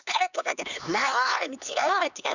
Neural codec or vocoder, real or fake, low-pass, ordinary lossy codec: codec, 16 kHz, 2 kbps, X-Codec, HuBERT features, trained on LibriSpeech; fake; 7.2 kHz; none